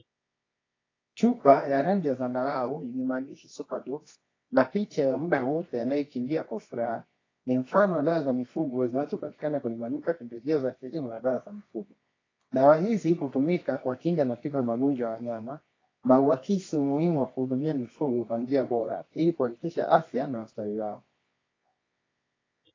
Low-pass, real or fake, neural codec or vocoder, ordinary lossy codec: 7.2 kHz; fake; codec, 24 kHz, 0.9 kbps, WavTokenizer, medium music audio release; AAC, 32 kbps